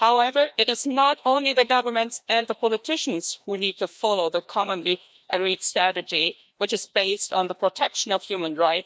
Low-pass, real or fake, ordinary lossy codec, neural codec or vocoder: none; fake; none; codec, 16 kHz, 1 kbps, FreqCodec, larger model